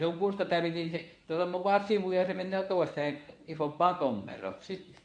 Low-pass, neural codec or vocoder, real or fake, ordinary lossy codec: 9.9 kHz; codec, 24 kHz, 0.9 kbps, WavTokenizer, medium speech release version 1; fake; none